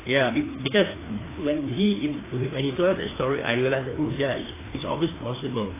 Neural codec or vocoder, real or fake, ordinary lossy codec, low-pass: codec, 16 kHz, 2 kbps, FreqCodec, larger model; fake; AAC, 16 kbps; 3.6 kHz